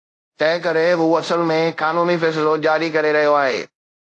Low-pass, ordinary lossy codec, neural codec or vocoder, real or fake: 10.8 kHz; AAC, 48 kbps; codec, 24 kHz, 0.5 kbps, DualCodec; fake